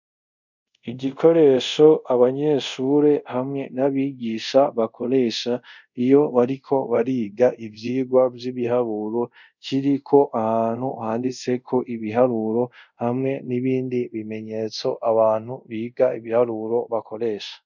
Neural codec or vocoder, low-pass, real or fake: codec, 24 kHz, 0.5 kbps, DualCodec; 7.2 kHz; fake